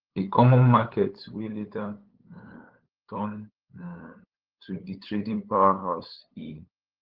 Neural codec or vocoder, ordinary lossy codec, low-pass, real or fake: codec, 16 kHz, 8 kbps, FunCodec, trained on LibriTTS, 25 frames a second; Opus, 16 kbps; 5.4 kHz; fake